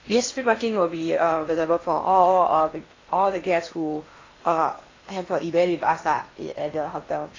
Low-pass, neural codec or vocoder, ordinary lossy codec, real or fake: 7.2 kHz; codec, 16 kHz in and 24 kHz out, 0.8 kbps, FocalCodec, streaming, 65536 codes; AAC, 32 kbps; fake